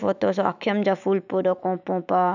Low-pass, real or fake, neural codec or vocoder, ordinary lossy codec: 7.2 kHz; fake; autoencoder, 48 kHz, 128 numbers a frame, DAC-VAE, trained on Japanese speech; none